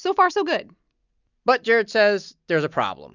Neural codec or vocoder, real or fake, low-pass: none; real; 7.2 kHz